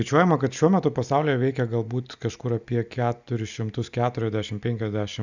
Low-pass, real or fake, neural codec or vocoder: 7.2 kHz; real; none